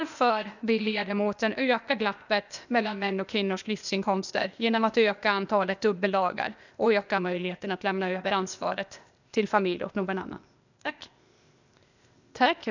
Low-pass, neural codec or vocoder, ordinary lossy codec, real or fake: 7.2 kHz; codec, 16 kHz, 0.8 kbps, ZipCodec; none; fake